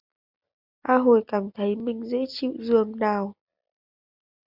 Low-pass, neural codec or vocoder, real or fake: 5.4 kHz; none; real